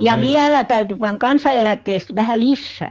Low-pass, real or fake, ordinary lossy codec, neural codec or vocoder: 7.2 kHz; fake; Opus, 24 kbps; codec, 16 kHz, 4 kbps, X-Codec, HuBERT features, trained on general audio